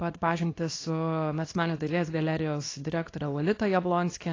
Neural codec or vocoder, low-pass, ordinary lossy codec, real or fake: codec, 24 kHz, 0.9 kbps, WavTokenizer, small release; 7.2 kHz; AAC, 32 kbps; fake